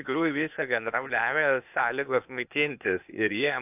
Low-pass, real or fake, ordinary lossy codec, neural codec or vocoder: 3.6 kHz; fake; AAC, 32 kbps; codec, 16 kHz, 0.8 kbps, ZipCodec